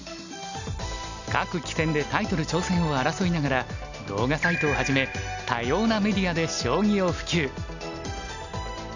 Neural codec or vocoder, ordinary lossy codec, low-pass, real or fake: none; none; 7.2 kHz; real